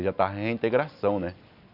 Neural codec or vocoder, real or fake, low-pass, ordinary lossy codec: none; real; 5.4 kHz; none